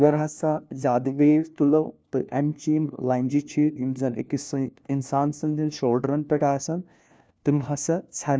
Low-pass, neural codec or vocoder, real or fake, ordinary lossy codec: none; codec, 16 kHz, 1 kbps, FunCodec, trained on LibriTTS, 50 frames a second; fake; none